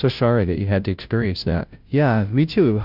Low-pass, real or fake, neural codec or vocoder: 5.4 kHz; fake; codec, 16 kHz, 0.5 kbps, FunCodec, trained on Chinese and English, 25 frames a second